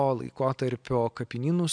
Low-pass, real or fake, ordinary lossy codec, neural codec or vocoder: 9.9 kHz; real; MP3, 96 kbps; none